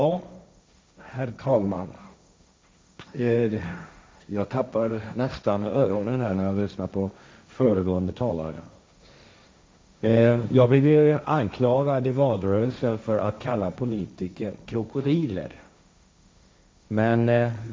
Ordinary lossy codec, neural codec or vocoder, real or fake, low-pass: none; codec, 16 kHz, 1.1 kbps, Voila-Tokenizer; fake; none